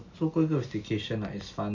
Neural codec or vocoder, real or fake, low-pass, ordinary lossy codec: none; real; 7.2 kHz; none